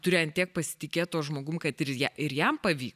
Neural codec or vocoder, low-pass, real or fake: none; 14.4 kHz; real